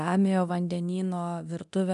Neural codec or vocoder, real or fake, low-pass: codec, 24 kHz, 0.9 kbps, DualCodec; fake; 10.8 kHz